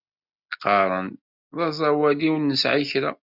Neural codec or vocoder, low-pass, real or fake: none; 5.4 kHz; real